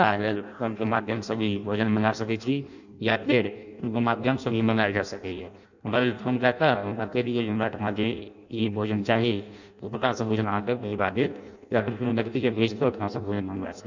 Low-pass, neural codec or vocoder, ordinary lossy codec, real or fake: 7.2 kHz; codec, 16 kHz in and 24 kHz out, 0.6 kbps, FireRedTTS-2 codec; none; fake